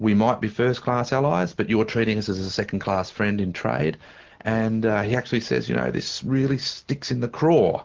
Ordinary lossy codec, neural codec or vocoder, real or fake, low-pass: Opus, 16 kbps; none; real; 7.2 kHz